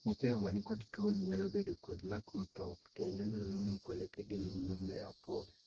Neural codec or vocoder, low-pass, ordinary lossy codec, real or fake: codec, 16 kHz, 1 kbps, FreqCodec, smaller model; 7.2 kHz; Opus, 16 kbps; fake